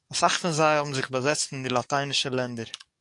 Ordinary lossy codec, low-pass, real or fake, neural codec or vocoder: AAC, 64 kbps; 10.8 kHz; fake; codec, 44.1 kHz, 7.8 kbps, DAC